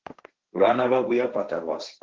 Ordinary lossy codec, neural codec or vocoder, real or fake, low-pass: Opus, 16 kbps; codec, 16 kHz, 1.1 kbps, Voila-Tokenizer; fake; 7.2 kHz